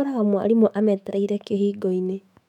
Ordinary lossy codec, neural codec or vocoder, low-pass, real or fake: none; autoencoder, 48 kHz, 128 numbers a frame, DAC-VAE, trained on Japanese speech; 19.8 kHz; fake